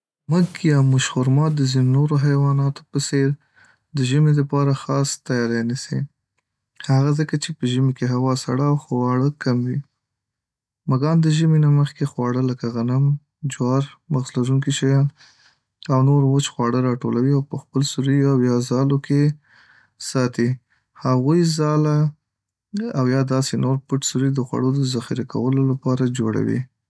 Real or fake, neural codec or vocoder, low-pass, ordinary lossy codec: real; none; none; none